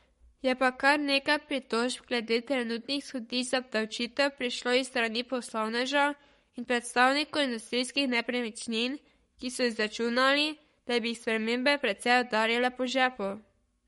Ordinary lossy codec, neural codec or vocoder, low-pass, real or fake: MP3, 48 kbps; codec, 44.1 kHz, 7.8 kbps, Pupu-Codec; 19.8 kHz; fake